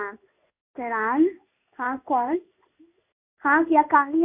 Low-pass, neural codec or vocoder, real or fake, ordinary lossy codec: 3.6 kHz; codec, 16 kHz in and 24 kHz out, 1 kbps, XY-Tokenizer; fake; none